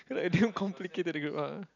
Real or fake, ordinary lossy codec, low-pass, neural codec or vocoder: real; none; 7.2 kHz; none